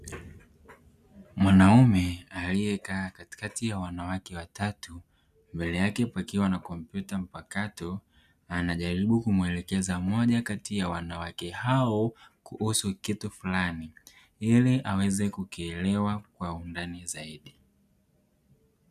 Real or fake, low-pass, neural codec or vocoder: real; 14.4 kHz; none